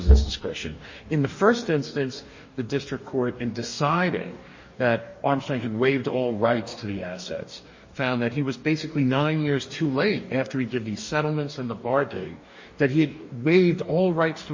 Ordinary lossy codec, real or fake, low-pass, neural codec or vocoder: MP3, 32 kbps; fake; 7.2 kHz; codec, 44.1 kHz, 2.6 kbps, DAC